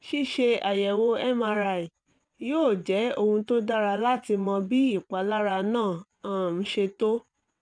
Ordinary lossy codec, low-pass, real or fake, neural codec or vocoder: none; 9.9 kHz; fake; vocoder, 22.05 kHz, 80 mel bands, WaveNeXt